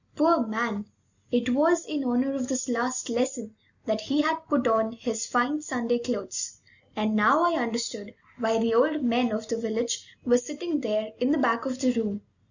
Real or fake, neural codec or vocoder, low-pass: real; none; 7.2 kHz